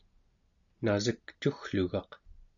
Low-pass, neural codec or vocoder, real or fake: 7.2 kHz; none; real